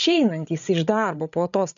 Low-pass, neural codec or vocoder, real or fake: 7.2 kHz; codec, 16 kHz, 8 kbps, FreqCodec, larger model; fake